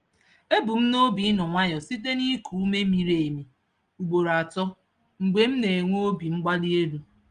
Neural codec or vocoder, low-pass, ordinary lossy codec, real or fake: none; 9.9 kHz; Opus, 24 kbps; real